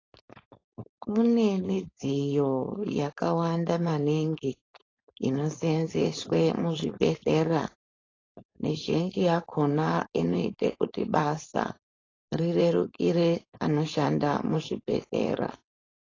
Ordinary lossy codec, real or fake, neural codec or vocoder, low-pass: AAC, 32 kbps; fake; codec, 16 kHz, 4.8 kbps, FACodec; 7.2 kHz